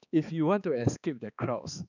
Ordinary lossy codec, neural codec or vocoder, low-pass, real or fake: none; codec, 16 kHz, 2 kbps, X-Codec, HuBERT features, trained on balanced general audio; 7.2 kHz; fake